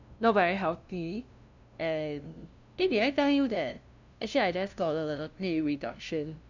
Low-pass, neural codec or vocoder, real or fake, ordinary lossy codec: 7.2 kHz; codec, 16 kHz, 0.5 kbps, FunCodec, trained on LibriTTS, 25 frames a second; fake; none